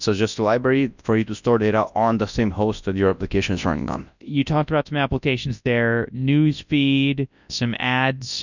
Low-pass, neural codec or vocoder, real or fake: 7.2 kHz; codec, 24 kHz, 0.9 kbps, WavTokenizer, large speech release; fake